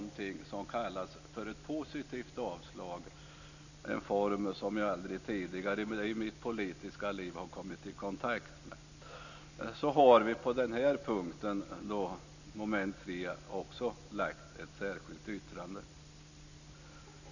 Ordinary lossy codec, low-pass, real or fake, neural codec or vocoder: none; 7.2 kHz; real; none